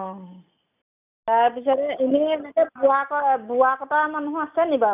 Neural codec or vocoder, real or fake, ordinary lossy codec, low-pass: none; real; none; 3.6 kHz